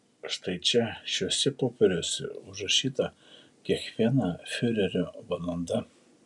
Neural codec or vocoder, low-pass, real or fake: none; 10.8 kHz; real